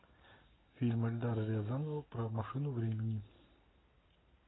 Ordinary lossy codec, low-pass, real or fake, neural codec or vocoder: AAC, 16 kbps; 7.2 kHz; real; none